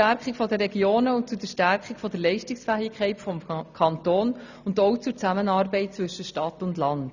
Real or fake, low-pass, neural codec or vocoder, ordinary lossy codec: real; 7.2 kHz; none; none